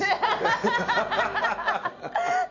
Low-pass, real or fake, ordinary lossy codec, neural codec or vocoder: 7.2 kHz; real; none; none